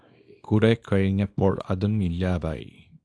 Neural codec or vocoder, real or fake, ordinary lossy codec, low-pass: codec, 24 kHz, 0.9 kbps, WavTokenizer, small release; fake; AAC, 48 kbps; 9.9 kHz